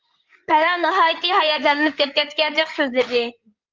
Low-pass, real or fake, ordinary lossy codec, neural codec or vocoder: 7.2 kHz; fake; Opus, 32 kbps; codec, 16 kHz in and 24 kHz out, 2.2 kbps, FireRedTTS-2 codec